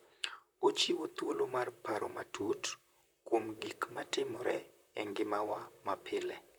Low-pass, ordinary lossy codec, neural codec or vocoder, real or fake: none; none; vocoder, 44.1 kHz, 128 mel bands, Pupu-Vocoder; fake